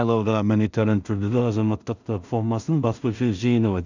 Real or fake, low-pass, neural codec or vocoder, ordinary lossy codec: fake; 7.2 kHz; codec, 16 kHz in and 24 kHz out, 0.4 kbps, LongCat-Audio-Codec, two codebook decoder; none